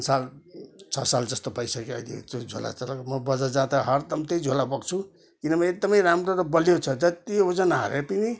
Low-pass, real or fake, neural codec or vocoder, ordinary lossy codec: none; real; none; none